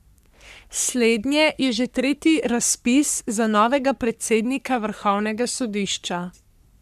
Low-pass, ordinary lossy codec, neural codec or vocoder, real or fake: 14.4 kHz; none; codec, 44.1 kHz, 3.4 kbps, Pupu-Codec; fake